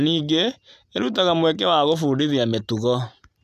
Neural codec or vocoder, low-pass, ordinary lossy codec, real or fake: none; 14.4 kHz; none; real